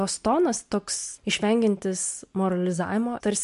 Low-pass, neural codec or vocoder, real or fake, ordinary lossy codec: 10.8 kHz; none; real; MP3, 64 kbps